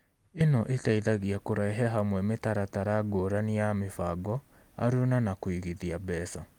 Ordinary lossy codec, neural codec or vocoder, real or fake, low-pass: Opus, 32 kbps; none; real; 19.8 kHz